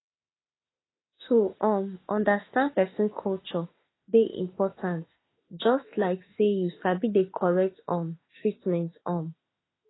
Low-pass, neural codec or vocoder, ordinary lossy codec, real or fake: 7.2 kHz; autoencoder, 48 kHz, 32 numbers a frame, DAC-VAE, trained on Japanese speech; AAC, 16 kbps; fake